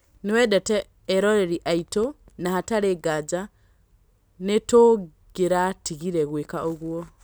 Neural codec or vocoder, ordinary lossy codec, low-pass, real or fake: none; none; none; real